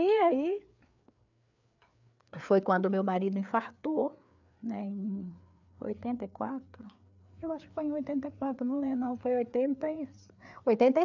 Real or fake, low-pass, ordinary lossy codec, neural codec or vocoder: fake; 7.2 kHz; none; codec, 16 kHz, 4 kbps, FreqCodec, larger model